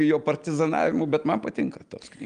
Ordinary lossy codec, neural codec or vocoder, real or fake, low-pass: Opus, 32 kbps; none; real; 9.9 kHz